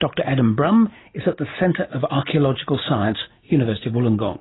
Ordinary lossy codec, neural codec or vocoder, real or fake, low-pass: AAC, 16 kbps; none; real; 7.2 kHz